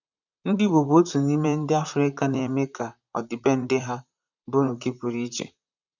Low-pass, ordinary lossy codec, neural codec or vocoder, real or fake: 7.2 kHz; none; vocoder, 44.1 kHz, 128 mel bands, Pupu-Vocoder; fake